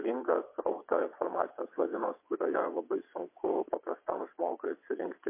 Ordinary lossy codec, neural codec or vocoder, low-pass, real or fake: MP3, 24 kbps; vocoder, 22.05 kHz, 80 mel bands, WaveNeXt; 3.6 kHz; fake